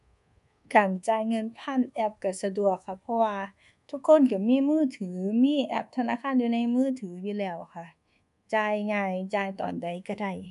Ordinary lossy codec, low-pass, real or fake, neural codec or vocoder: MP3, 96 kbps; 10.8 kHz; fake; codec, 24 kHz, 1.2 kbps, DualCodec